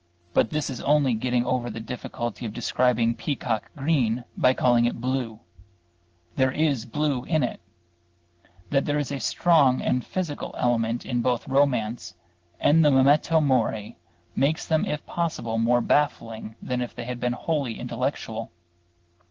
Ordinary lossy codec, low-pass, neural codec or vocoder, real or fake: Opus, 16 kbps; 7.2 kHz; none; real